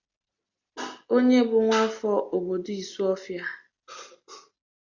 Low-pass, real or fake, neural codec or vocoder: 7.2 kHz; real; none